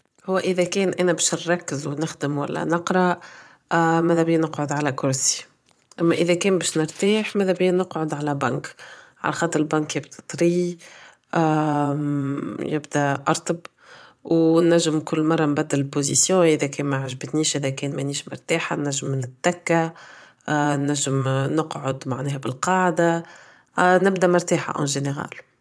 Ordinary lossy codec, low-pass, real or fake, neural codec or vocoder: none; none; fake; vocoder, 22.05 kHz, 80 mel bands, Vocos